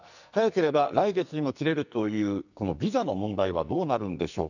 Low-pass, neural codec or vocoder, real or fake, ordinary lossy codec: 7.2 kHz; codec, 44.1 kHz, 2.6 kbps, SNAC; fake; none